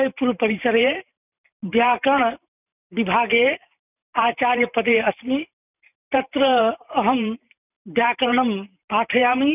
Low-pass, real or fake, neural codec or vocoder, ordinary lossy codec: 3.6 kHz; fake; vocoder, 44.1 kHz, 128 mel bands every 512 samples, BigVGAN v2; none